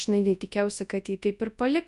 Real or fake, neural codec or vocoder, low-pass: fake; codec, 24 kHz, 0.9 kbps, WavTokenizer, large speech release; 10.8 kHz